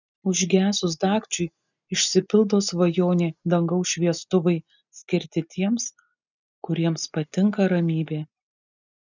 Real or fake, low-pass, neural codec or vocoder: real; 7.2 kHz; none